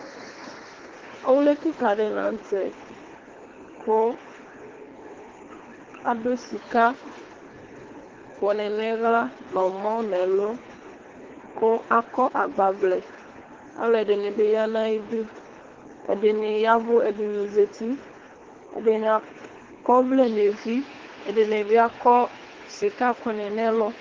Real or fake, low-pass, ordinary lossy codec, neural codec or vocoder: fake; 7.2 kHz; Opus, 16 kbps; codec, 24 kHz, 3 kbps, HILCodec